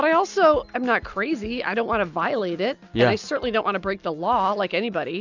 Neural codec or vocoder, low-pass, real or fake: none; 7.2 kHz; real